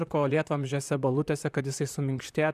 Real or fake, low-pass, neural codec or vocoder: fake; 14.4 kHz; vocoder, 44.1 kHz, 128 mel bands, Pupu-Vocoder